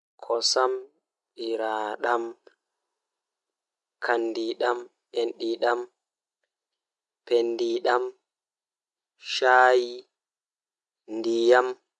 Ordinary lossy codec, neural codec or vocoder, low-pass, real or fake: none; none; 10.8 kHz; real